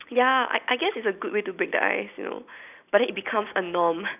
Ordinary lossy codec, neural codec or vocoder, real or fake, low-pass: none; none; real; 3.6 kHz